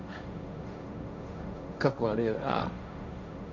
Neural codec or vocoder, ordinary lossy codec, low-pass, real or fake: codec, 16 kHz, 1.1 kbps, Voila-Tokenizer; none; 7.2 kHz; fake